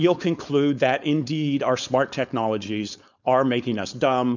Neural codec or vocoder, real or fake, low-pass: codec, 16 kHz, 4.8 kbps, FACodec; fake; 7.2 kHz